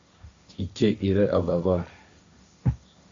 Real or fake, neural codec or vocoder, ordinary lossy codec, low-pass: fake; codec, 16 kHz, 1.1 kbps, Voila-Tokenizer; AAC, 64 kbps; 7.2 kHz